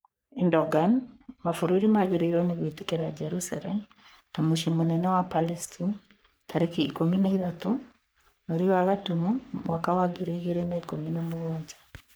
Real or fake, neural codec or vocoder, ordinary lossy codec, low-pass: fake; codec, 44.1 kHz, 3.4 kbps, Pupu-Codec; none; none